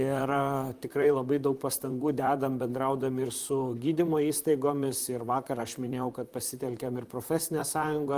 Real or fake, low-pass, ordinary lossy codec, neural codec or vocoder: fake; 14.4 kHz; Opus, 32 kbps; vocoder, 44.1 kHz, 128 mel bands, Pupu-Vocoder